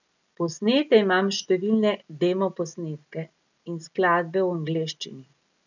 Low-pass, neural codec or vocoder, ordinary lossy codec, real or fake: 7.2 kHz; none; none; real